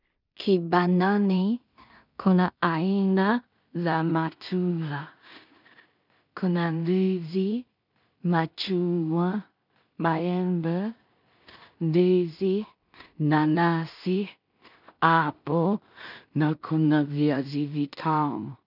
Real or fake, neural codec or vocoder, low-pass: fake; codec, 16 kHz in and 24 kHz out, 0.4 kbps, LongCat-Audio-Codec, two codebook decoder; 5.4 kHz